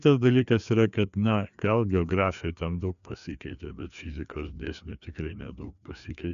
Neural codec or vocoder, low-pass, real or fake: codec, 16 kHz, 2 kbps, FreqCodec, larger model; 7.2 kHz; fake